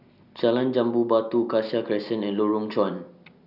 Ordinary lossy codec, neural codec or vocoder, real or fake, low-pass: none; none; real; 5.4 kHz